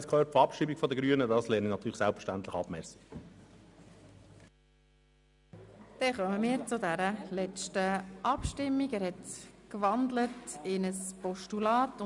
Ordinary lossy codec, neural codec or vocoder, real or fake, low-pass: none; none; real; 10.8 kHz